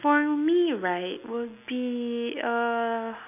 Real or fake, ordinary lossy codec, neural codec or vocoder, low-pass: real; none; none; 3.6 kHz